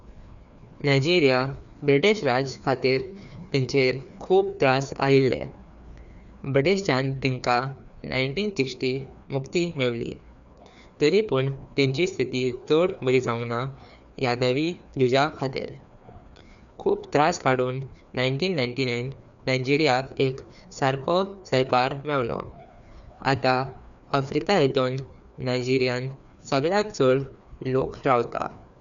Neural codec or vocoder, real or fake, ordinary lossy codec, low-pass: codec, 16 kHz, 2 kbps, FreqCodec, larger model; fake; none; 7.2 kHz